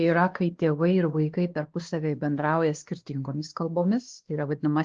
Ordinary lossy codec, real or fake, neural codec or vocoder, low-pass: Opus, 16 kbps; fake; codec, 16 kHz, 2 kbps, X-Codec, WavLM features, trained on Multilingual LibriSpeech; 7.2 kHz